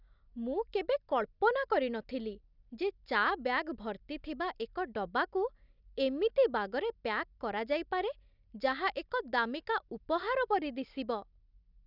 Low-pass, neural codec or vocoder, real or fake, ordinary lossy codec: 5.4 kHz; none; real; none